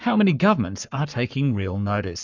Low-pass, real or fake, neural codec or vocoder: 7.2 kHz; fake; codec, 44.1 kHz, 7.8 kbps, Pupu-Codec